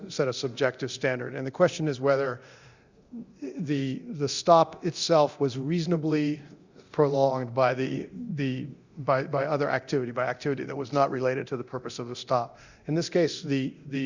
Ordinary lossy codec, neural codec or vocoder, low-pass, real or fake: Opus, 64 kbps; codec, 24 kHz, 0.9 kbps, DualCodec; 7.2 kHz; fake